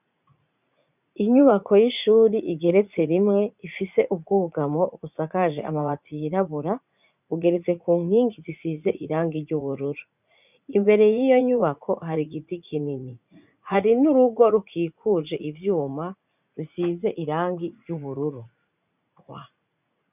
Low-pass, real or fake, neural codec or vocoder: 3.6 kHz; fake; vocoder, 44.1 kHz, 80 mel bands, Vocos